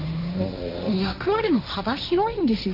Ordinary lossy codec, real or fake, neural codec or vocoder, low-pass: none; fake; codec, 16 kHz, 1.1 kbps, Voila-Tokenizer; 5.4 kHz